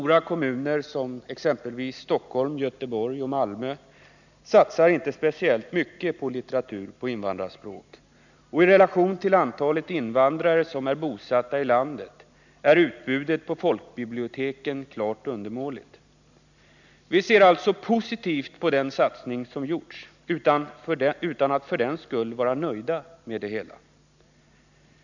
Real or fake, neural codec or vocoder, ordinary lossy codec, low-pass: real; none; none; 7.2 kHz